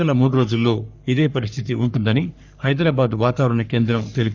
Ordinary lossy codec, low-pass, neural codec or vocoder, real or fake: none; 7.2 kHz; codec, 44.1 kHz, 3.4 kbps, Pupu-Codec; fake